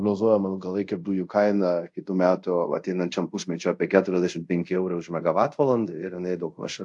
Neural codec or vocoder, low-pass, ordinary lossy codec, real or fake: codec, 24 kHz, 0.5 kbps, DualCodec; 10.8 kHz; AAC, 64 kbps; fake